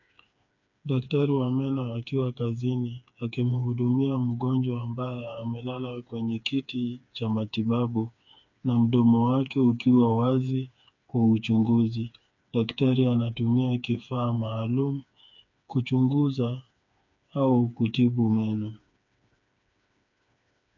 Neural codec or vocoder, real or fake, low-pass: codec, 16 kHz, 4 kbps, FreqCodec, smaller model; fake; 7.2 kHz